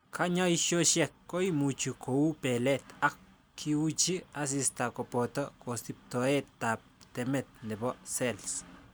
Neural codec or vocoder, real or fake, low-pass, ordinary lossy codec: none; real; none; none